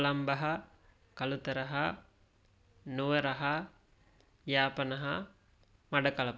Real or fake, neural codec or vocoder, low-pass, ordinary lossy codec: real; none; none; none